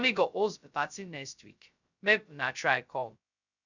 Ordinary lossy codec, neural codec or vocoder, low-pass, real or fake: none; codec, 16 kHz, 0.2 kbps, FocalCodec; 7.2 kHz; fake